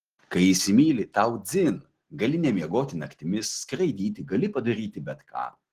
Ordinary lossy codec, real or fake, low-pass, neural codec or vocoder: Opus, 16 kbps; real; 14.4 kHz; none